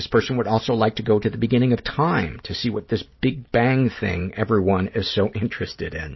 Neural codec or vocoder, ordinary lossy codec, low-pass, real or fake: none; MP3, 24 kbps; 7.2 kHz; real